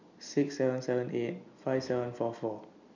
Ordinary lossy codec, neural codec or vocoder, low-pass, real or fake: none; none; 7.2 kHz; real